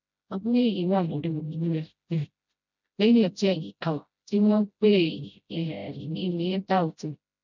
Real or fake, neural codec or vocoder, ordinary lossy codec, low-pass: fake; codec, 16 kHz, 0.5 kbps, FreqCodec, smaller model; none; 7.2 kHz